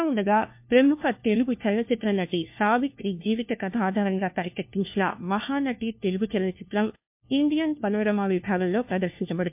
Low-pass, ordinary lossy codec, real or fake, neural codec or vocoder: 3.6 kHz; MP3, 32 kbps; fake; codec, 16 kHz, 1 kbps, FunCodec, trained on LibriTTS, 50 frames a second